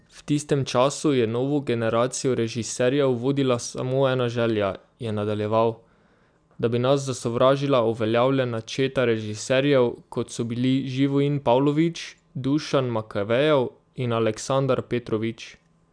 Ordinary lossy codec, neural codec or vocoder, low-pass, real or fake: none; none; 9.9 kHz; real